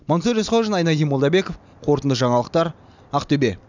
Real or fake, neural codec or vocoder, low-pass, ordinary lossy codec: real; none; 7.2 kHz; none